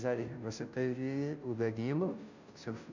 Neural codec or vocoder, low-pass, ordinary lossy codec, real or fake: codec, 16 kHz, 0.5 kbps, FunCodec, trained on Chinese and English, 25 frames a second; 7.2 kHz; none; fake